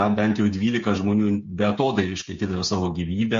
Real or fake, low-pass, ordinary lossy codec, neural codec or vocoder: fake; 7.2 kHz; MP3, 48 kbps; codec, 16 kHz, 8 kbps, FreqCodec, smaller model